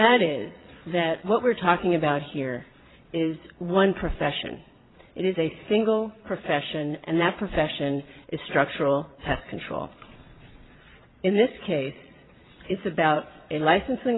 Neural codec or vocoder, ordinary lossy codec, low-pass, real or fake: vocoder, 22.05 kHz, 80 mel bands, Vocos; AAC, 16 kbps; 7.2 kHz; fake